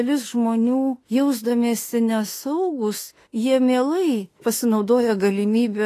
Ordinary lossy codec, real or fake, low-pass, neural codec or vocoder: AAC, 48 kbps; fake; 14.4 kHz; autoencoder, 48 kHz, 32 numbers a frame, DAC-VAE, trained on Japanese speech